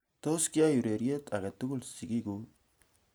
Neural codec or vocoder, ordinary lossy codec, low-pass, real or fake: none; none; none; real